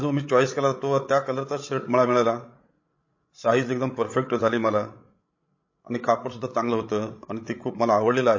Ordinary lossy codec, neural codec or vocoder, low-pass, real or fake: MP3, 32 kbps; codec, 16 kHz, 16 kbps, FreqCodec, larger model; 7.2 kHz; fake